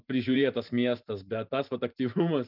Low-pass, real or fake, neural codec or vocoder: 5.4 kHz; fake; vocoder, 44.1 kHz, 128 mel bands every 512 samples, BigVGAN v2